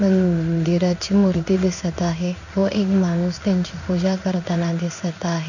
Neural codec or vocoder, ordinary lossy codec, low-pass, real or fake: codec, 16 kHz in and 24 kHz out, 1 kbps, XY-Tokenizer; none; 7.2 kHz; fake